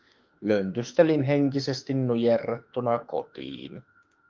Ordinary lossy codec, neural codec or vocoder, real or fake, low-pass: Opus, 24 kbps; autoencoder, 48 kHz, 32 numbers a frame, DAC-VAE, trained on Japanese speech; fake; 7.2 kHz